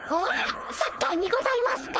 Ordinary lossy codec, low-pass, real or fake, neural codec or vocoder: none; none; fake; codec, 16 kHz, 4.8 kbps, FACodec